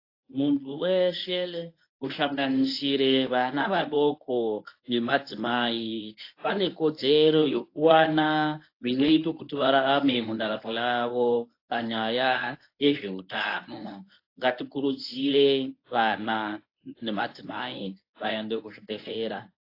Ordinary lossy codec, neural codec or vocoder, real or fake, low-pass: AAC, 32 kbps; codec, 24 kHz, 0.9 kbps, WavTokenizer, medium speech release version 1; fake; 5.4 kHz